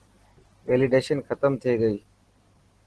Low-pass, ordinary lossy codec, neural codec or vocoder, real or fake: 10.8 kHz; Opus, 16 kbps; none; real